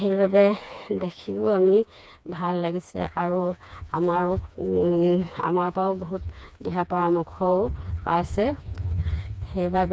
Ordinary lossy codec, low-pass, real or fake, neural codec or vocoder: none; none; fake; codec, 16 kHz, 2 kbps, FreqCodec, smaller model